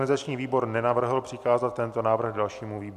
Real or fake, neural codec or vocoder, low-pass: real; none; 14.4 kHz